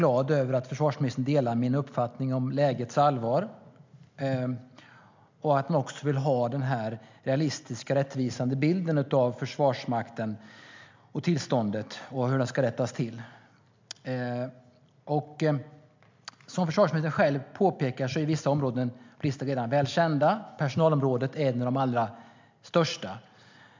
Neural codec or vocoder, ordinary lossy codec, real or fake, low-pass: none; MP3, 64 kbps; real; 7.2 kHz